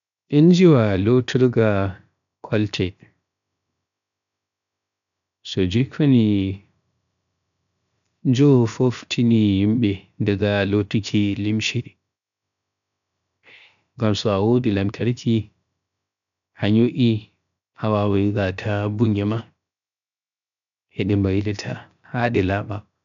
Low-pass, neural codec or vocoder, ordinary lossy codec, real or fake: 7.2 kHz; codec, 16 kHz, 0.7 kbps, FocalCodec; none; fake